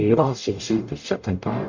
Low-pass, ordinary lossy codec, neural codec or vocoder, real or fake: 7.2 kHz; Opus, 64 kbps; codec, 44.1 kHz, 0.9 kbps, DAC; fake